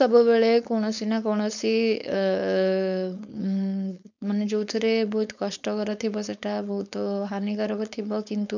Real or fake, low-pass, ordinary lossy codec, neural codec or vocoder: fake; 7.2 kHz; none; codec, 16 kHz, 4.8 kbps, FACodec